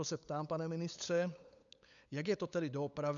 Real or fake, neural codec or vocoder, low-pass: fake; codec, 16 kHz, 8 kbps, FunCodec, trained on LibriTTS, 25 frames a second; 7.2 kHz